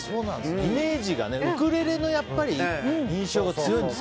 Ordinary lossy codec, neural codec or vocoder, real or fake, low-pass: none; none; real; none